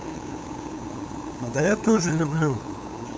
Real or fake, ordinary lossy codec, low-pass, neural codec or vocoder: fake; none; none; codec, 16 kHz, 8 kbps, FunCodec, trained on LibriTTS, 25 frames a second